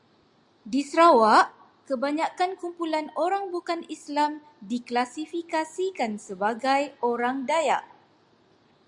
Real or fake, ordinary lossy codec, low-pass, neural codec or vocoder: real; Opus, 64 kbps; 10.8 kHz; none